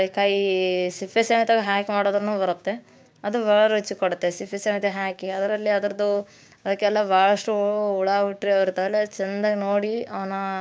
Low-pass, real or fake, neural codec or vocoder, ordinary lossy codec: none; fake; codec, 16 kHz, 6 kbps, DAC; none